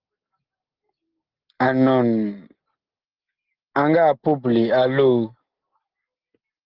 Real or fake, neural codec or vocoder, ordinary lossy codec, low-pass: real; none; Opus, 16 kbps; 5.4 kHz